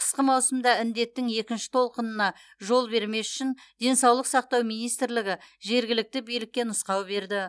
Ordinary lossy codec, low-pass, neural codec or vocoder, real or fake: none; none; none; real